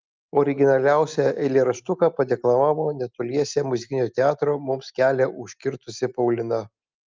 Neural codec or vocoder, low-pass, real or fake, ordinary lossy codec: none; 7.2 kHz; real; Opus, 24 kbps